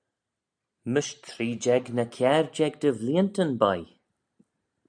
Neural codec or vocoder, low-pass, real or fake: none; 9.9 kHz; real